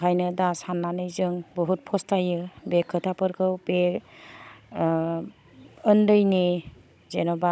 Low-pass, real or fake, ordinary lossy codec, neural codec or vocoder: none; fake; none; codec, 16 kHz, 16 kbps, FunCodec, trained on Chinese and English, 50 frames a second